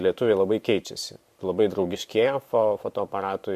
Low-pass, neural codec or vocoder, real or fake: 14.4 kHz; vocoder, 44.1 kHz, 128 mel bands every 512 samples, BigVGAN v2; fake